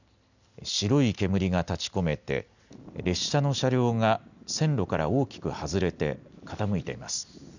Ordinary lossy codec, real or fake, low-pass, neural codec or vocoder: none; real; 7.2 kHz; none